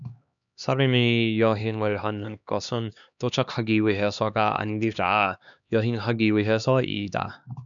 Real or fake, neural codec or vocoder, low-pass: fake; codec, 16 kHz, 2 kbps, X-Codec, HuBERT features, trained on LibriSpeech; 7.2 kHz